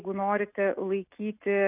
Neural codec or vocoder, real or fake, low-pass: none; real; 3.6 kHz